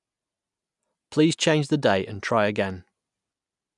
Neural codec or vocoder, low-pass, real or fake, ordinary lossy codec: none; 10.8 kHz; real; none